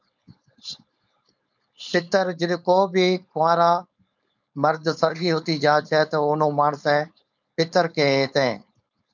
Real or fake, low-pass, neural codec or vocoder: fake; 7.2 kHz; codec, 16 kHz, 4.8 kbps, FACodec